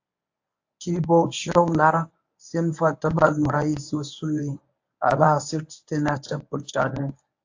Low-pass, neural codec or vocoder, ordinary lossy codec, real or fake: 7.2 kHz; codec, 24 kHz, 0.9 kbps, WavTokenizer, medium speech release version 1; AAC, 48 kbps; fake